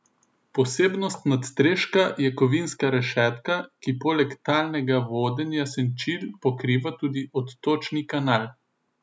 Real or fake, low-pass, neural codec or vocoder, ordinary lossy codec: real; none; none; none